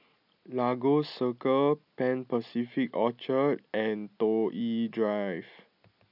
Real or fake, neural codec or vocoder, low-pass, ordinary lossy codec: real; none; 5.4 kHz; none